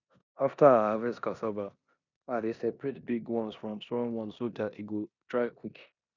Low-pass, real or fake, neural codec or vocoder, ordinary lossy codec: 7.2 kHz; fake; codec, 16 kHz in and 24 kHz out, 0.9 kbps, LongCat-Audio-Codec, four codebook decoder; none